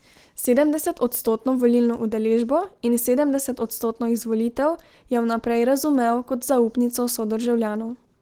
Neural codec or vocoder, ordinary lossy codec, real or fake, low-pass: none; Opus, 16 kbps; real; 19.8 kHz